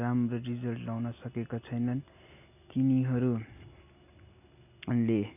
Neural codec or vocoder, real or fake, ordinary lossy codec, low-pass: none; real; none; 3.6 kHz